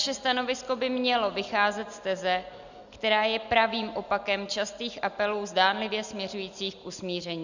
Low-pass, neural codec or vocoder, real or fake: 7.2 kHz; none; real